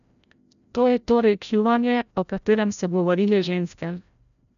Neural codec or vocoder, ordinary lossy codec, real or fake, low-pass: codec, 16 kHz, 0.5 kbps, FreqCodec, larger model; none; fake; 7.2 kHz